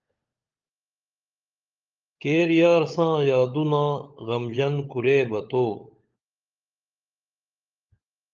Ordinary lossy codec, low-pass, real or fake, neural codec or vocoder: Opus, 24 kbps; 7.2 kHz; fake; codec, 16 kHz, 16 kbps, FunCodec, trained on LibriTTS, 50 frames a second